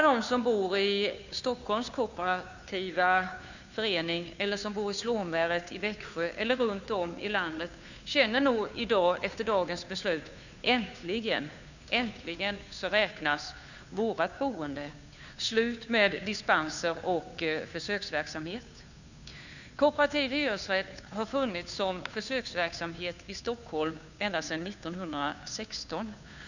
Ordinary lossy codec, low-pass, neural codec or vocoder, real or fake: none; 7.2 kHz; codec, 16 kHz, 2 kbps, FunCodec, trained on Chinese and English, 25 frames a second; fake